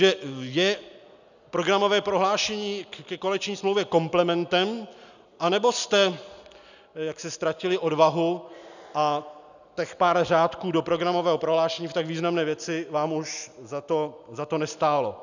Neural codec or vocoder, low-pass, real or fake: none; 7.2 kHz; real